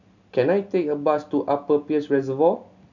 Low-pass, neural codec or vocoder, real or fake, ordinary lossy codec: 7.2 kHz; none; real; none